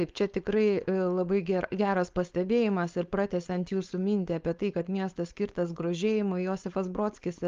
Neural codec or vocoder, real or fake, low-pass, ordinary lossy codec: codec, 16 kHz, 4.8 kbps, FACodec; fake; 7.2 kHz; Opus, 32 kbps